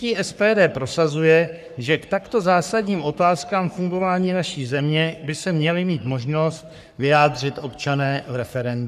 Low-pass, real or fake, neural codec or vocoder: 14.4 kHz; fake; codec, 44.1 kHz, 3.4 kbps, Pupu-Codec